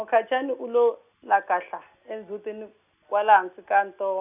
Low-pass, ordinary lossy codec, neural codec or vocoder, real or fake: 3.6 kHz; none; none; real